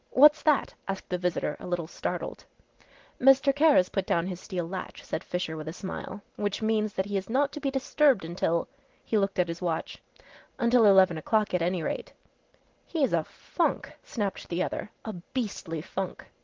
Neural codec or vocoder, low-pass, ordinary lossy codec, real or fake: none; 7.2 kHz; Opus, 16 kbps; real